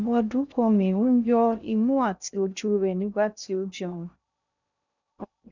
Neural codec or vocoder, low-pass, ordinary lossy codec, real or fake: codec, 16 kHz in and 24 kHz out, 0.6 kbps, FocalCodec, streaming, 2048 codes; 7.2 kHz; none; fake